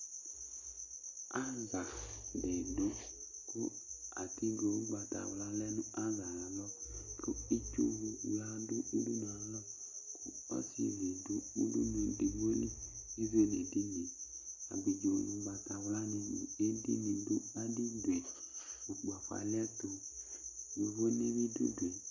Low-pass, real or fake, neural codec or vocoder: 7.2 kHz; real; none